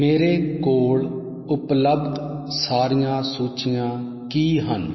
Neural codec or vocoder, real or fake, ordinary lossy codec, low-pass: none; real; MP3, 24 kbps; 7.2 kHz